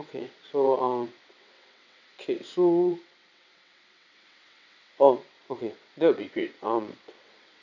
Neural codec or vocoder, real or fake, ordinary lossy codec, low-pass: vocoder, 22.05 kHz, 80 mel bands, WaveNeXt; fake; none; 7.2 kHz